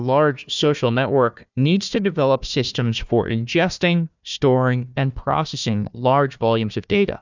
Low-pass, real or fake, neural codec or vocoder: 7.2 kHz; fake; codec, 16 kHz, 1 kbps, FunCodec, trained on Chinese and English, 50 frames a second